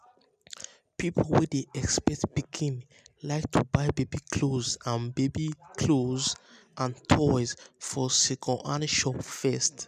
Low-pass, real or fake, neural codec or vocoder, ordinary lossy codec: 14.4 kHz; real; none; none